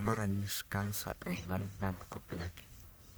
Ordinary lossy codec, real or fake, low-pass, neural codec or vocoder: none; fake; none; codec, 44.1 kHz, 1.7 kbps, Pupu-Codec